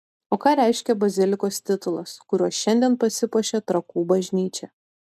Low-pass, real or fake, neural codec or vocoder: 14.4 kHz; real; none